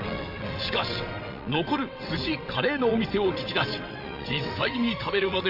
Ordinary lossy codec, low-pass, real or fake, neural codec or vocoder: none; 5.4 kHz; fake; vocoder, 22.05 kHz, 80 mel bands, WaveNeXt